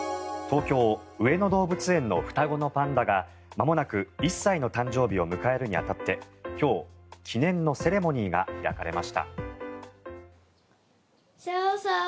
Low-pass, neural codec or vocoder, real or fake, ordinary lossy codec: none; none; real; none